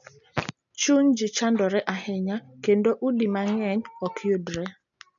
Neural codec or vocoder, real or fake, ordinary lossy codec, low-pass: none; real; none; 7.2 kHz